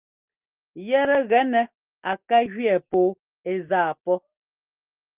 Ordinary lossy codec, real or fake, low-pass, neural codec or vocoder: Opus, 24 kbps; real; 3.6 kHz; none